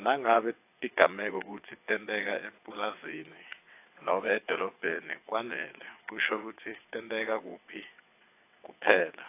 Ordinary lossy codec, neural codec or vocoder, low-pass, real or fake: AAC, 24 kbps; vocoder, 22.05 kHz, 80 mel bands, WaveNeXt; 3.6 kHz; fake